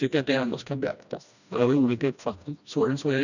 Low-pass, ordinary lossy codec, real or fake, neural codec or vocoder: 7.2 kHz; none; fake; codec, 16 kHz, 1 kbps, FreqCodec, smaller model